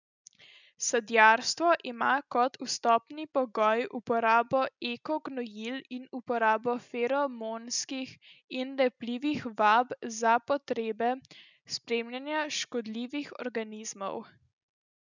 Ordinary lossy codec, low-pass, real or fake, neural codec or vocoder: none; 7.2 kHz; real; none